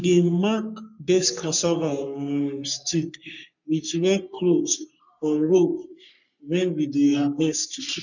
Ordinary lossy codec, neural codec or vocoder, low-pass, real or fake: none; codec, 44.1 kHz, 3.4 kbps, Pupu-Codec; 7.2 kHz; fake